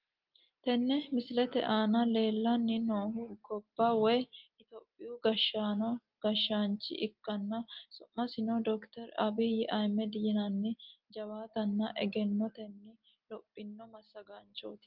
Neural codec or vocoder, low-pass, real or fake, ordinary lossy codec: none; 5.4 kHz; real; Opus, 32 kbps